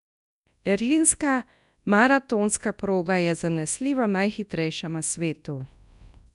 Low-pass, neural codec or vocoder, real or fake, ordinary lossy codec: 10.8 kHz; codec, 24 kHz, 0.9 kbps, WavTokenizer, large speech release; fake; none